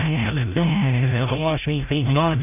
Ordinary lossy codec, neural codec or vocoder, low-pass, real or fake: none; codec, 16 kHz, 0.5 kbps, FreqCodec, larger model; 3.6 kHz; fake